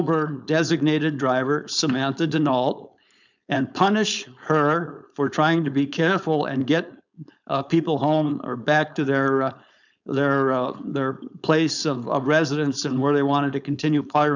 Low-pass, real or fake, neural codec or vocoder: 7.2 kHz; fake; codec, 16 kHz, 4.8 kbps, FACodec